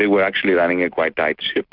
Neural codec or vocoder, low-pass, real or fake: none; 5.4 kHz; real